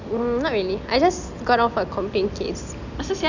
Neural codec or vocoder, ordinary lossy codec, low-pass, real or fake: none; none; 7.2 kHz; real